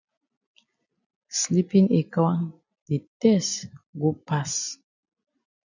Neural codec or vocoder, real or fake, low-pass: none; real; 7.2 kHz